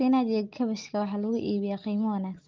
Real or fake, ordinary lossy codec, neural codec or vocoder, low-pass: real; Opus, 32 kbps; none; 7.2 kHz